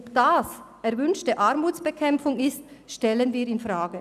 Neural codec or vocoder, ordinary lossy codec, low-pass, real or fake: none; none; 14.4 kHz; real